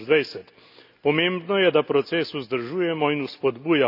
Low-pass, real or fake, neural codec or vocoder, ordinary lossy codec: 5.4 kHz; real; none; none